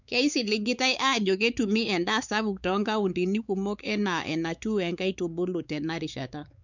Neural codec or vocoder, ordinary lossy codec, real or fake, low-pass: codec, 16 kHz, 4 kbps, X-Codec, WavLM features, trained on Multilingual LibriSpeech; none; fake; 7.2 kHz